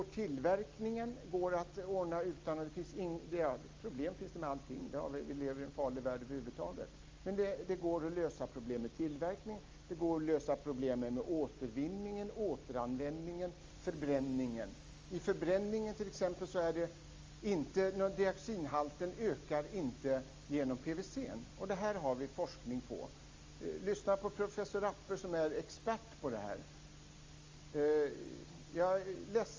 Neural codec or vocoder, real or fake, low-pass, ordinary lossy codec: none; real; 7.2 kHz; Opus, 32 kbps